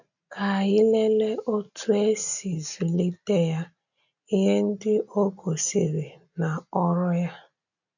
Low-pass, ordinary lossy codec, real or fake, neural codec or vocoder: 7.2 kHz; none; real; none